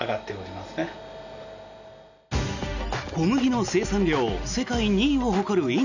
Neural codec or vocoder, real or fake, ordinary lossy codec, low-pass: none; real; none; 7.2 kHz